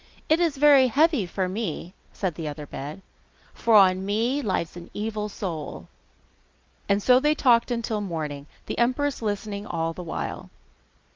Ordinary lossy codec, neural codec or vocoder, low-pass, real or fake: Opus, 32 kbps; none; 7.2 kHz; real